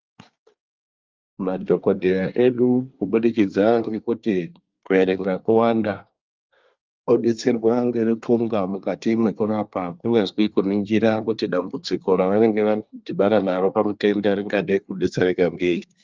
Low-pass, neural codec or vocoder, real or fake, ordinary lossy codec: 7.2 kHz; codec, 24 kHz, 1 kbps, SNAC; fake; Opus, 24 kbps